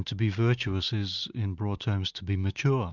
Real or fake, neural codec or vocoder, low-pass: real; none; 7.2 kHz